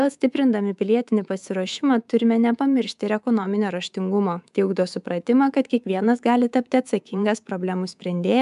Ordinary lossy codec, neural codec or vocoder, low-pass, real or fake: MP3, 96 kbps; codec, 24 kHz, 3.1 kbps, DualCodec; 10.8 kHz; fake